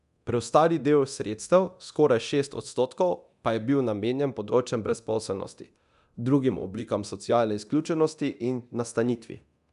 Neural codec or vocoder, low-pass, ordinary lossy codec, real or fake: codec, 24 kHz, 0.9 kbps, DualCodec; 10.8 kHz; none; fake